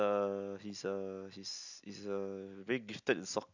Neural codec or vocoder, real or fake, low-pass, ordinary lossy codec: none; real; 7.2 kHz; none